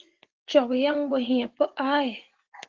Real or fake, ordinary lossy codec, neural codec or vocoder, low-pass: fake; Opus, 16 kbps; vocoder, 24 kHz, 100 mel bands, Vocos; 7.2 kHz